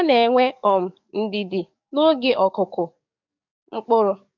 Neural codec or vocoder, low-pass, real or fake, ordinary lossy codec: codec, 44.1 kHz, 7.8 kbps, DAC; 7.2 kHz; fake; MP3, 64 kbps